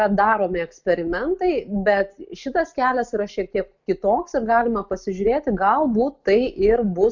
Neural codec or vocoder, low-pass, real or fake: none; 7.2 kHz; real